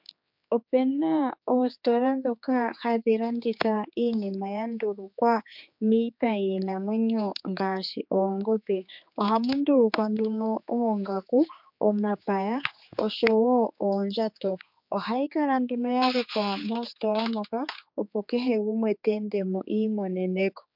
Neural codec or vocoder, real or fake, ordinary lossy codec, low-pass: codec, 16 kHz, 4 kbps, X-Codec, HuBERT features, trained on general audio; fake; MP3, 48 kbps; 5.4 kHz